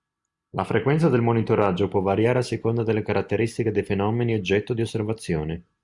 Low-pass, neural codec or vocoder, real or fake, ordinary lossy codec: 10.8 kHz; none; real; Opus, 64 kbps